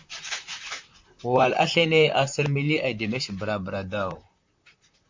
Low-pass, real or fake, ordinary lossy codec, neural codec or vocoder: 7.2 kHz; fake; MP3, 64 kbps; vocoder, 44.1 kHz, 128 mel bands, Pupu-Vocoder